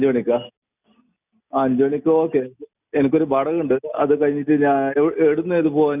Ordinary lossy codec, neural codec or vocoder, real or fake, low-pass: none; none; real; 3.6 kHz